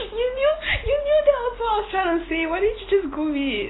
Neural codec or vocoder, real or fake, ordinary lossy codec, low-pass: none; real; AAC, 16 kbps; 7.2 kHz